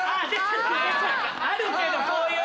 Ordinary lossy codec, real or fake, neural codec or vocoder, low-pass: none; real; none; none